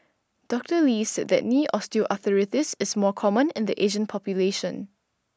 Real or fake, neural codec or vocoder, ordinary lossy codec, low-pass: real; none; none; none